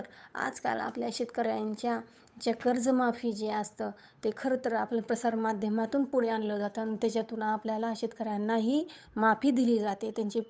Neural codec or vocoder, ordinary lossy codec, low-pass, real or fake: codec, 16 kHz, 8 kbps, FunCodec, trained on Chinese and English, 25 frames a second; none; none; fake